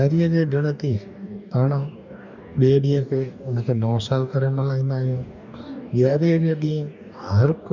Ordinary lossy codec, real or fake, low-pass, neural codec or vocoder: none; fake; 7.2 kHz; codec, 44.1 kHz, 2.6 kbps, DAC